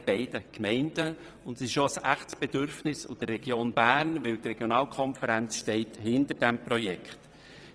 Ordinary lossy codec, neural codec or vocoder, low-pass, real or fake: none; vocoder, 22.05 kHz, 80 mel bands, WaveNeXt; none; fake